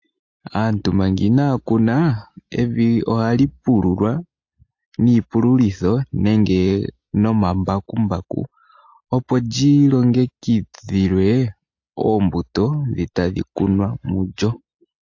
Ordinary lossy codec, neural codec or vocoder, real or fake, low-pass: AAC, 48 kbps; none; real; 7.2 kHz